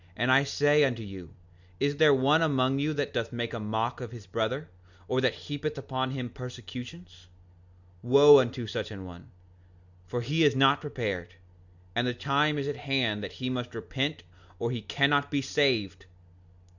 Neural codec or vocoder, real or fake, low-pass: none; real; 7.2 kHz